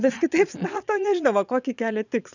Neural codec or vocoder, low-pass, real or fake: vocoder, 22.05 kHz, 80 mel bands, Vocos; 7.2 kHz; fake